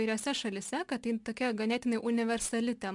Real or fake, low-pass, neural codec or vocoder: real; 10.8 kHz; none